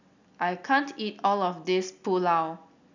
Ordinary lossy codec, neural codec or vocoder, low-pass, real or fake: none; none; 7.2 kHz; real